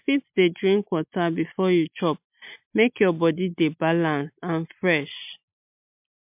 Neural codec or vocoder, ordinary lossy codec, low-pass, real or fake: none; MP3, 32 kbps; 3.6 kHz; real